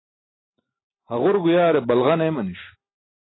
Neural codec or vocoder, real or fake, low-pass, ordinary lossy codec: none; real; 7.2 kHz; AAC, 16 kbps